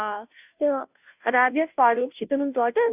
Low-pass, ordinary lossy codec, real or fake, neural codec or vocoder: 3.6 kHz; none; fake; codec, 16 kHz, 0.5 kbps, FunCodec, trained on Chinese and English, 25 frames a second